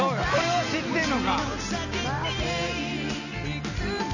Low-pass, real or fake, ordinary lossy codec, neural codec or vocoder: 7.2 kHz; real; none; none